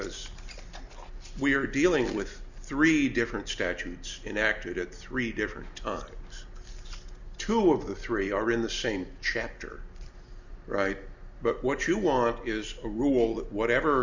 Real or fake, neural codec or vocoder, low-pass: real; none; 7.2 kHz